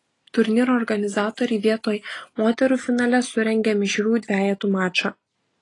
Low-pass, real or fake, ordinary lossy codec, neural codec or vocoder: 10.8 kHz; real; AAC, 32 kbps; none